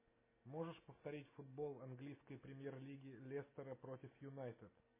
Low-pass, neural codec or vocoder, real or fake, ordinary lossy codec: 3.6 kHz; none; real; MP3, 16 kbps